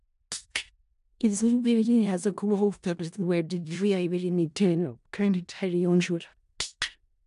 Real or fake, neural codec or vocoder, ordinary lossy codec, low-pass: fake; codec, 16 kHz in and 24 kHz out, 0.4 kbps, LongCat-Audio-Codec, four codebook decoder; AAC, 96 kbps; 10.8 kHz